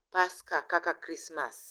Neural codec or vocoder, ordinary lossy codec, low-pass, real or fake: autoencoder, 48 kHz, 128 numbers a frame, DAC-VAE, trained on Japanese speech; Opus, 16 kbps; 19.8 kHz; fake